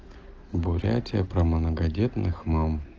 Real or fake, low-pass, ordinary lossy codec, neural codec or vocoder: real; 7.2 kHz; Opus, 16 kbps; none